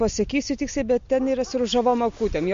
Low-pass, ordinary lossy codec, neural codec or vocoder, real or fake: 7.2 kHz; MP3, 48 kbps; none; real